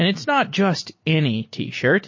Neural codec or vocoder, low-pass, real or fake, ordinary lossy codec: none; 7.2 kHz; real; MP3, 32 kbps